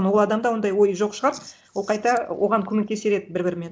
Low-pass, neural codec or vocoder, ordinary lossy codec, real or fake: none; none; none; real